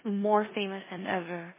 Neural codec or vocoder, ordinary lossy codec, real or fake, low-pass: codec, 16 kHz in and 24 kHz out, 0.9 kbps, LongCat-Audio-Codec, four codebook decoder; MP3, 16 kbps; fake; 3.6 kHz